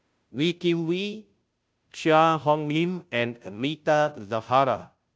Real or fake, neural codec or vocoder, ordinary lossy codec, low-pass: fake; codec, 16 kHz, 0.5 kbps, FunCodec, trained on Chinese and English, 25 frames a second; none; none